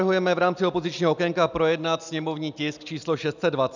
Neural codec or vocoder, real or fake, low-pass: none; real; 7.2 kHz